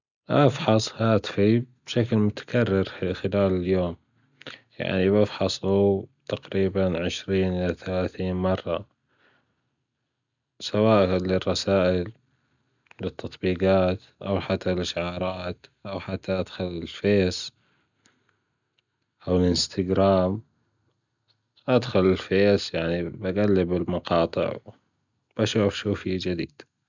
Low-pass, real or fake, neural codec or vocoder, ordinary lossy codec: 7.2 kHz; real; none; none